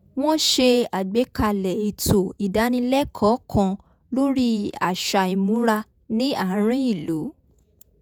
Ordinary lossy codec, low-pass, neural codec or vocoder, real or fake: none; none; vocoder, 48 kHz, 128 mel bands, Vocos; fake